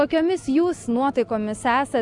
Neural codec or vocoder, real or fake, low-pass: none; real; 10.8 kHz